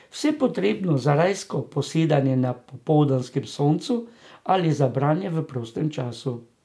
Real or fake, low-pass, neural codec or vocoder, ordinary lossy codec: real; none; none; none